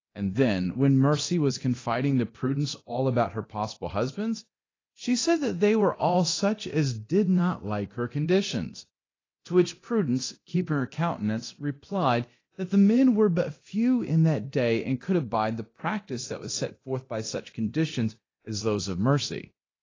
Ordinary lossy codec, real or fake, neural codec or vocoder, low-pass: AAC, 32 kbps; fake; codec, 24 kHz, 0.9 kbps, DualCodec; 7.2 kHz